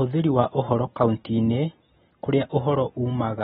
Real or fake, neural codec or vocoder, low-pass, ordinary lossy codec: real; none; 19.8 kHz; AAC, 16 kbps